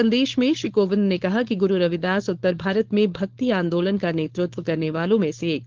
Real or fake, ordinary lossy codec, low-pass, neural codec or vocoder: fake; Opus, 16 kbps; 7.2 kHz; codec, 16 kHz, 4.8 kbps, FACodec